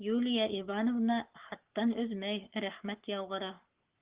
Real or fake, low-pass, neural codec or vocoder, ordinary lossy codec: fake; 3.6 kHz; codec, 44.1 kHz, 7.8 kbps, Pupu-Codec; Opus, 16 kbps